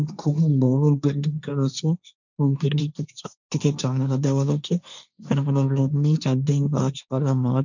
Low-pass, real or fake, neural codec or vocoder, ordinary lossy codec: 7.2 kHz; fake; codec, 16 kHz, 1.1 kbps, Voila-Tokenizer; none